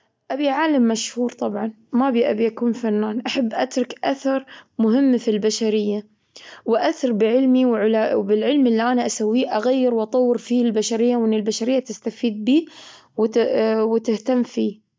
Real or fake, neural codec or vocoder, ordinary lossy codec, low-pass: real; none; none; none